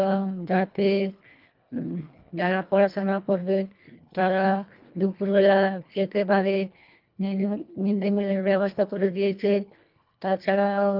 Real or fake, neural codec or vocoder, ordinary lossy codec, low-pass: fake; codec, 24 kHz, 1.5 kbps, HILCodec; Opus, 24 kbps; 5.4 kHz